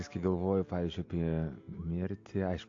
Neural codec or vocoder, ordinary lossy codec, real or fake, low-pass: none; AAC, 48 kbps; real; 7.2 kHz